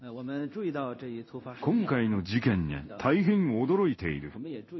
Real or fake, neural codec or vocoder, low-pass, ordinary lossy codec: fake; codec, 16 kHz in and 24 kHz out, 1 kbps, XY-Tokenizer; 7.2 kHz; MP3, 24 kbps